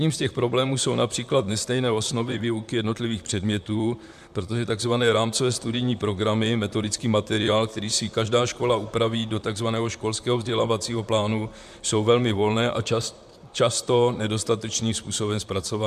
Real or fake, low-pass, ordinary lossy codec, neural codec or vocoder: fake; 14.4 kHz; MP3, 96 kbps; vocoder, 44.1 kHz, 128 mel bands, Pupu-Vocoder